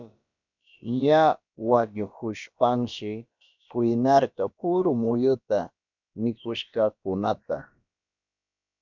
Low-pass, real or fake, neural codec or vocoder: 7.2 kHz; fake; codec, 16 kHz, about 1 kbps, DyCAST, with the encoder's durations